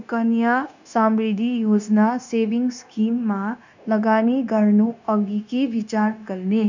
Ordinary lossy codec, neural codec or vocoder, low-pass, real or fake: none; codec, 16 kHz, 0.9 kbps, LongCat-Audio-Codec; 7.2 kHz; fake